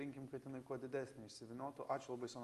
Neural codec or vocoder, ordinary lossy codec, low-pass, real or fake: none; Opus, 32 kbps; 14.4 kHz; real